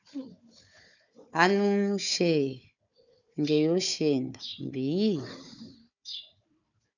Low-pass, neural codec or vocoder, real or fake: 7.2 kHz; codec, 16 kHz, 4 kbps, FunCodec, trained on Chinese and English, 50 frames a second; fake